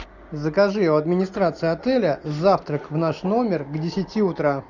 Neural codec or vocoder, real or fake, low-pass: none; real; 7.2 kHz